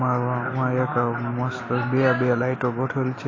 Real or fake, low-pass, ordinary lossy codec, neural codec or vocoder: real; 7.2 kHz; AAC, 32 kbps; none